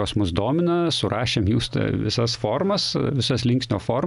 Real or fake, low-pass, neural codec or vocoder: real; 10.8 kHz; none